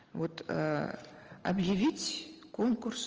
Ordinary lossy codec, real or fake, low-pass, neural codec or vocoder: Opus, 24 kbps; real; 7.2 kHz; none